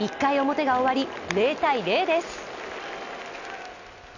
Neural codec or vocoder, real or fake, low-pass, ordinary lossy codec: none; real; 7.2 kHz; none